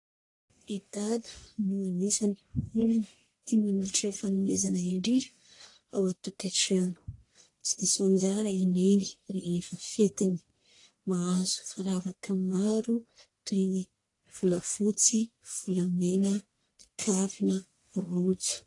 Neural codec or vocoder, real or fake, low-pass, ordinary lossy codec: codec, 44.1 kHz, 1.7 kbps, Pupu-Codec; fake; 10.8 kHz; AAC, 48 kbps